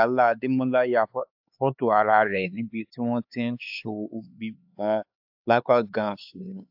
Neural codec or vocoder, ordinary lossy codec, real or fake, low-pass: codec, 16 kHz, 4 kbps, X-Codec, HuBERT features, trained on LibriSpeech; AAC, 48 kbps; fake; 5.4 kHz